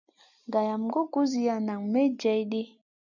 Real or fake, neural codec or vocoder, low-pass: real; none; 7.2 kHz